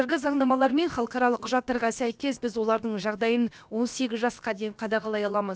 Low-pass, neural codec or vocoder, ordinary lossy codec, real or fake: none; codec, 16 kHz, about 1 kbps, DyCAST, with the encoder's durations; none; fake